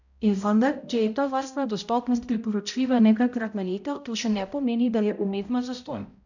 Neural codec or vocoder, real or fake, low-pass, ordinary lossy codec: codec, 16 kHz, 0.5 kbps, X-Codec, HuBERT features, trained on balanced general audio; fake; 7.2 kHz; none